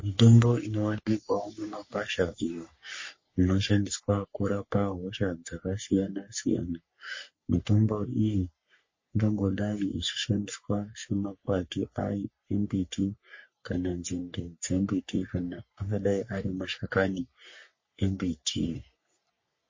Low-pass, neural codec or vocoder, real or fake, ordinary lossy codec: 7.2 kHz; codec, 44.1 kHz, 3.4 kbps, Pupu-Codec; fake; MP3, 32 kbps